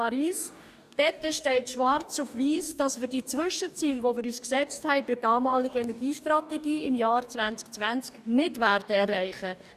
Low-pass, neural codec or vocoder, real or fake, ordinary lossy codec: 14.4 kHz; codec, 44.1 kHz, 2.6 kbps, DAC; fake; none